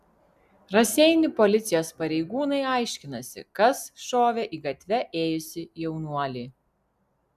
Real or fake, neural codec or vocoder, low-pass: real; none; 14.4 kHz